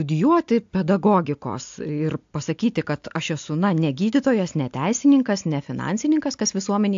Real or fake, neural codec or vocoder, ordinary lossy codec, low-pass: real; none; AAC, 64 kbps; 7.2 kHz